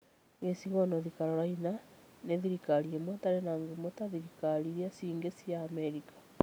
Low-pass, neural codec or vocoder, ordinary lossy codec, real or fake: none; none; none; real